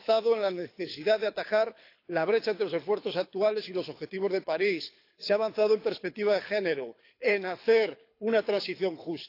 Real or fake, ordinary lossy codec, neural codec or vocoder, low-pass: fake; AAC, 32 kbps; codec, 24 kHz, 6 kbps, HILCodec; 5.4 kHz